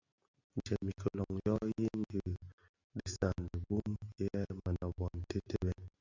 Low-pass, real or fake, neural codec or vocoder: 7.2 kHz; real; none